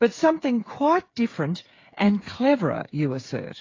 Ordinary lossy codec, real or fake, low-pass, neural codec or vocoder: AAC, 32 kbps; fake; 7.2 kHz; codec, 16 kHz, 8 kbps, FreqCodec, smaller model